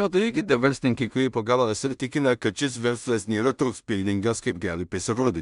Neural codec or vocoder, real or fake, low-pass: codec, 16 kHz in and 24 kHz out, 0.4 kbps, LongCat-Audio-Codec, two codebook decoder; fake; 10.8 kHz